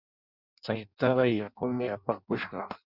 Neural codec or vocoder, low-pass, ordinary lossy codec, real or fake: codec, 16 kHz in and 24 kHz out, 0.6 kbps, FireRedTTS-2 codec; 5.4 kHz; Opus, 64 kbps; fake